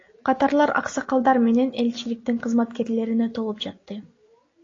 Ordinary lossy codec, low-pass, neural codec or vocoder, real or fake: AAC, 32 kbps; 7.2 kHz; none; real